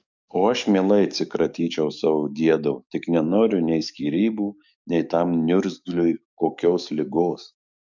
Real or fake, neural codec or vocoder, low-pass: fake; codec, 44.1 kHz, 7.8 kbps, DAC; 7.2 kHz